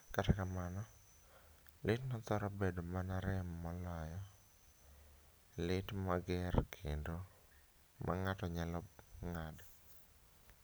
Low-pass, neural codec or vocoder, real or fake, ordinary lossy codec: none; none; real; none